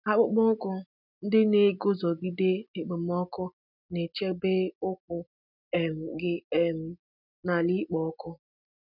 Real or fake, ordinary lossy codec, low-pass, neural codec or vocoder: real; none; 5.4 kHz; none